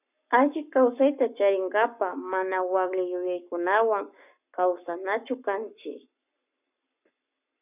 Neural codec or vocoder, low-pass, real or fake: codec, 44.1 kHz, 7.8 kbps, Pupu-Codec; 3.6 kHz; fake